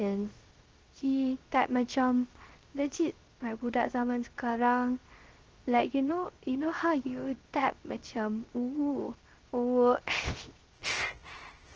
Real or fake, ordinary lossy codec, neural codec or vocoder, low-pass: fake; Opus, 16 kbps; codec, 16 kHz, 0.3 kbps, FocalCodec; 7.2 kHz